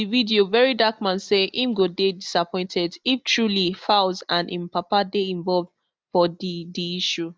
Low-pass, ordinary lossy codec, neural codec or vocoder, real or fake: none; none; none; real